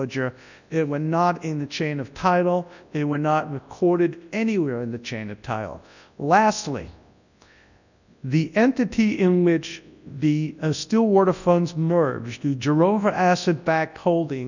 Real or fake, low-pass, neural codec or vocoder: fake; 7.2 kHz; codec, 24 kHz, 0.9 kbps, WavTokenizer, large speech release